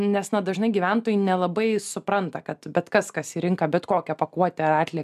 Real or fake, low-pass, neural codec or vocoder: real; 14.4 kHz; none